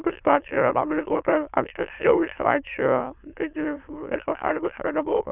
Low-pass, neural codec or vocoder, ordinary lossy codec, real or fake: 3.6 kHz; autoencoder, 22.05 kHz, a latent of 192 numbers a frame, VITS, trained on many speakers; Opus, 64 kbps; fake